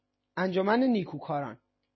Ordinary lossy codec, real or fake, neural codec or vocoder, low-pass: MP3, 24 kbps; real; none; 7.2 kHz